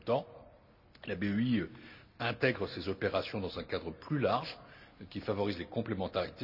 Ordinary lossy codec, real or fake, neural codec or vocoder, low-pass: none; real; none; 5.4 kHz